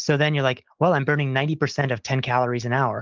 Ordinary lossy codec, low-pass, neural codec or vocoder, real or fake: Opus, 32 kbps; 7.2 kHz; none; real